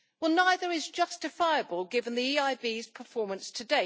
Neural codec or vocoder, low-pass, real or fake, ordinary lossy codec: none; none; real; none